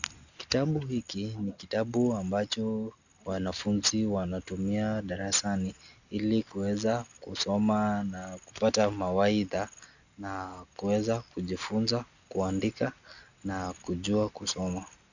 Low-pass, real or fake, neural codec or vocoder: 7.2 kHz; real; none